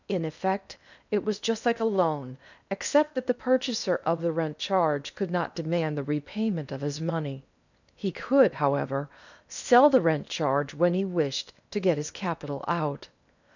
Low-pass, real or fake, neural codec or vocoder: 7.2 kHz; fake; codec, 16 kHz in and 24 kHz out, 0.8 kbps, FocalCodec, streaming, 65536 codes